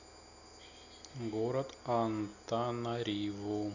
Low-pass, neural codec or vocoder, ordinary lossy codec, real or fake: 7.2 kHz; none; none; real